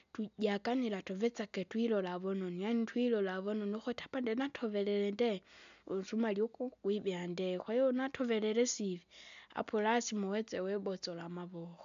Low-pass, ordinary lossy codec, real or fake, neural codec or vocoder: 7.2 kHz; AAC, 96 kbps; real; none